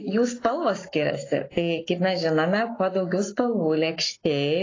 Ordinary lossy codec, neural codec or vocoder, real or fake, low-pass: AAC, 32 kbps; codec, 44.1 kHz, 7.8 kbps, Pupu-Codec; fake; 7.2 kHz